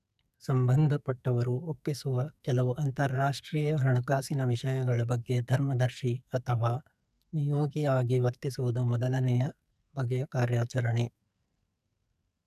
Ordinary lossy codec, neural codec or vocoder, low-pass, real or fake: none; codec, 44.1 kHz, 2.6 kbps, SNAC; 14.4 kHz; fake